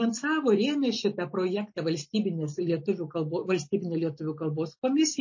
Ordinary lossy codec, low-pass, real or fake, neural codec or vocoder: MP3, 32 kbps; 7.2 kHz; real; none